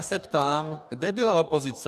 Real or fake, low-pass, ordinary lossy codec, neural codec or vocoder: fake; 14.4 kHz; AAC, 96 kbps; codec, 44.1 kHz, 2.6 kbps, DAC